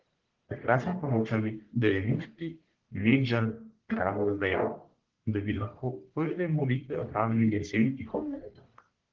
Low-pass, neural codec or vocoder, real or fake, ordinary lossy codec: 7.2 kHz; codec, 44.1 kHz, 1.7 kbps, Pupu-Codec; fake; Opus, 16 kbps